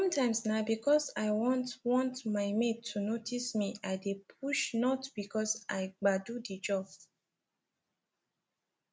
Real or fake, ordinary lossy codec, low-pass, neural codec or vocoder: real; none; none; none